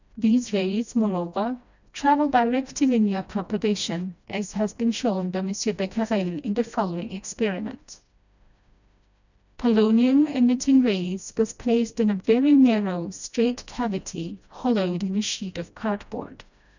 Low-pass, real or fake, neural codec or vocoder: 7.2 kHz; fake; codec, 16 kHz, 1 kbps, FreqCodec, smaller model